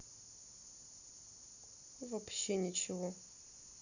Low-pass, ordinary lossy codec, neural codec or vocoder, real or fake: 7.2 kHz; none; none; real